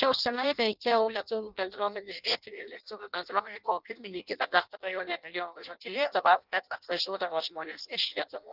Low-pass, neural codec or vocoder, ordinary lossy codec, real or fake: 5.4 kHz; codec, 16 kHz in and 24 kHz out, 0.6 kbps, FireRedTTS-2 codec; Opus, 32 kbps; fake